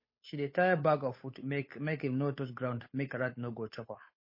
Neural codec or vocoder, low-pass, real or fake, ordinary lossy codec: codec, 16 kHz, 8 kbps, FunCodec, trained on Chinese and English, 25 frames a second; 5.4 kHz; fake; MP3, 24 kbps